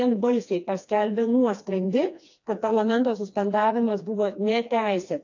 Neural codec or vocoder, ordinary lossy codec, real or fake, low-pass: codec, 16 kHz, 2 kbps, FreqCodec, smaller model; AAC, 48 kbps; fake; 7.2 kHz